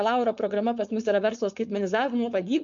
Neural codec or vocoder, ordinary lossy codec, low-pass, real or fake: codec, 16 kHz, 4.8 kbps, FACodec; MP3, 64 kbps; 7.2 kHz; fake